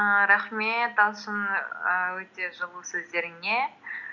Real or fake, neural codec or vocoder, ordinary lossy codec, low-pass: real; none; none; 7.2 kHz